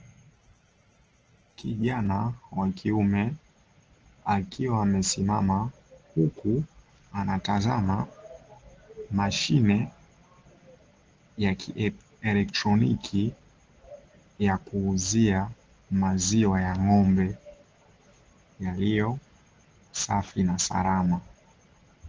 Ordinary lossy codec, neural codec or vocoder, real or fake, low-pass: Opus, 16 kbps; none; real; 7.2 kHz